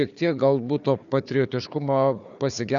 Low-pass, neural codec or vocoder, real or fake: 7.2 kHz; codec, 16 kHz, 4 kbps, FunCodec, trained on Chinese and English, 50 frames a second; fake